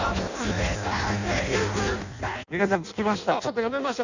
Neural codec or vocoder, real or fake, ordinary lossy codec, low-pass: codec, 16 kHz in and 24 kHz out, 0.6 kbps, FireRedTTS-2 codec; fake; none; 7.2 kHz